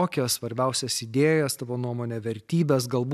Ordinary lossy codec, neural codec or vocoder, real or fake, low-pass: AAC, 96 kbps; none; real; 14.4 kHz